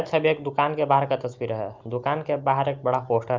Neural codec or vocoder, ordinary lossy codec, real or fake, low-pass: none; Opus, 24 kbps; real; 7.2 kHz